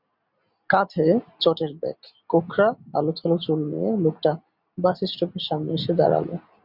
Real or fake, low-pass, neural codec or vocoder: real; 5.4 kHz; none